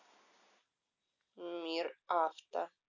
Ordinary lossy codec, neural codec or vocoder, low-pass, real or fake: none; none; 7.2 kHz; real